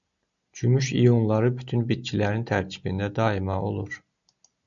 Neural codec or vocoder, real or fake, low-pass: none; real; 7.2 kHz